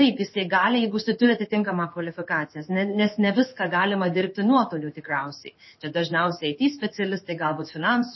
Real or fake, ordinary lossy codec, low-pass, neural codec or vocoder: fake; MP3, 24 kbps; 7.2 kHz; codec, 16 kHz in and 24 kHz out, 1 kbps, XY-Tokenizer